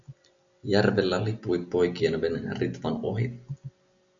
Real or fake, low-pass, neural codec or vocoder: real; 7.2 kHz; none